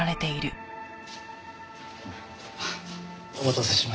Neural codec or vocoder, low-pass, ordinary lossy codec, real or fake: none; none; none; real